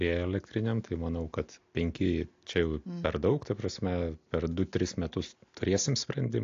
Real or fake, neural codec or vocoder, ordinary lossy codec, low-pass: real; none; AAC, 48 kbps; 7.2 kHz